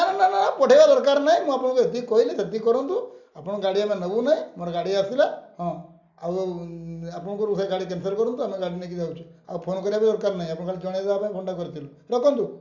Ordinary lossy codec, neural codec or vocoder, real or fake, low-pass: none; none; real; 7.2 kHz